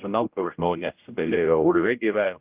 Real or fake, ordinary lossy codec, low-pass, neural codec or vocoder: fake; Opus, 24 kbps; 3.6 kHz; codec, 16 kHz, 0.5 kbps, X-Codec, HuBERT features, trained on general audio